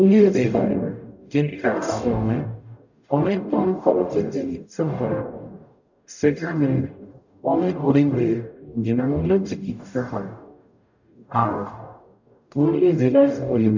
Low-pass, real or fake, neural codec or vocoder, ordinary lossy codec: 7.2 kHz; fake; codec, 44.1 kHz, 0.9 kbps, DAC; none